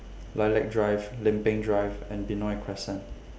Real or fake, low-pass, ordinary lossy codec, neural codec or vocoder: real; none; none; none